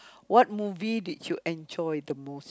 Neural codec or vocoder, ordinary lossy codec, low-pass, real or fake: none; none; none; real